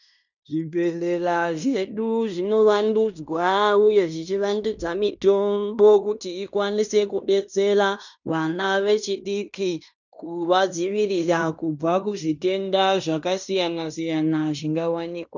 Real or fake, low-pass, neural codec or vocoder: fake; 7.2 kHz; codec, 16 kHz in and 24 kHz out, 0.9 kbps, LongCat-Audio-Codec, fine tuned four codebook decoder